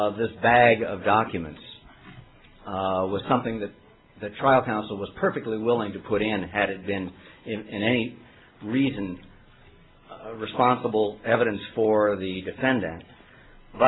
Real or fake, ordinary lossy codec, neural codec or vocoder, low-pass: real; AAC, 16 kbps; none; 7.2 kHz